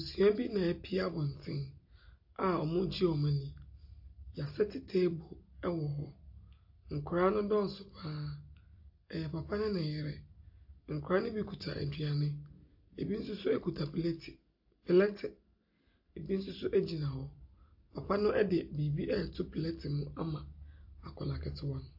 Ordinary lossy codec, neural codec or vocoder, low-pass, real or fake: AAC, 48 kbps; none; 5.4 kHz; real